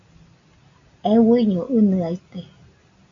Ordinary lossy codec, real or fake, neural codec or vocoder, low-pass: AAC, 48 kbps; real; none; 7.2 kHz